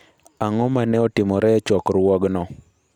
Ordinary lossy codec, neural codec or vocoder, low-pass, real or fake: none; none; 19.8 kHz; real